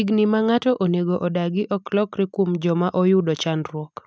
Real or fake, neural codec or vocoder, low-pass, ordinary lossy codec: real; none; none; none